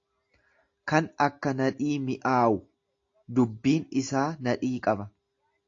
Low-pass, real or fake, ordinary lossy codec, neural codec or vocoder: 7.2 kHz; real; AAC, 48 kbps; none